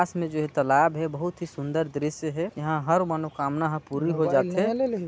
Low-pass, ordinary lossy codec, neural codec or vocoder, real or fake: none; none; none; real